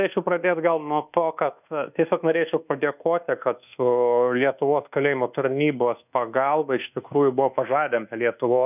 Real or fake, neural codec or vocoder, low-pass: fake; codec, 24 kHz, 1.2 kbps, DualCodec; 3.6 kHz